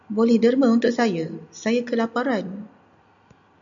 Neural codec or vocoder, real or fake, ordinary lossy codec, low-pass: none; real; MP3, 96 kbps; 7.2 kHz